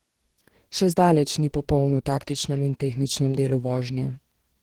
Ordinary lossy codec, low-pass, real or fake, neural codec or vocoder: Opus, 16 kbps; 19.8 kHz; fake; codec, 44.1 kHz, 2.6 kbps, DAC